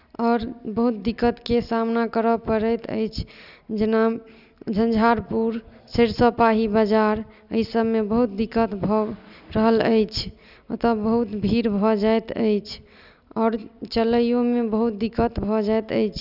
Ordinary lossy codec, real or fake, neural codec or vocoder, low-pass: none; real; none; 5.4 kHz